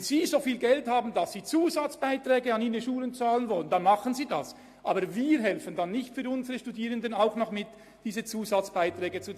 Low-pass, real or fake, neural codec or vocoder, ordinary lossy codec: 14.4 kHz; real; none; AAC, 64 kbps